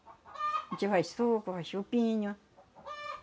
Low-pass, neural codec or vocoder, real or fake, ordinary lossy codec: none; none; real; none